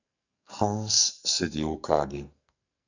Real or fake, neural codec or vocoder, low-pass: fake; codec, 44.1 kHz, 2.6 kbps, SNAC; 7.2 kHz